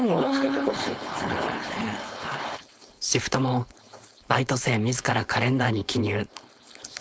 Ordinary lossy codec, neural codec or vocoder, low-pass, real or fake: none; codec, 16 kHz, 4.8 kbps, FACodec; none; fake